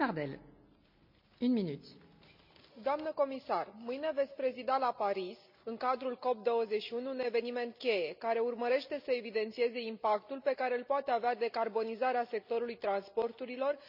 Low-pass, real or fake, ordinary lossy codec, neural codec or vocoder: 5.4 kHz; real; none; none